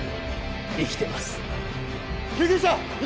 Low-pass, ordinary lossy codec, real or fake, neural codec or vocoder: none; none; real; none